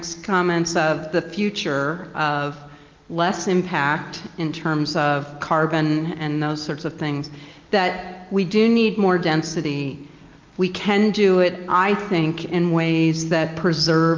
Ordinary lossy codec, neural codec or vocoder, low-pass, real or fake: Opus, 32 kbps; none; 7.2 kHz; real